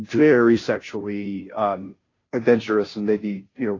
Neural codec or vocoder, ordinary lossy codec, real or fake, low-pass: codec, 16 kHz, 0.5 kbps, FunCodec, trained on Chinese and English, 25 frames a second; AAC, 32 kbps; fake; 7.2 kHz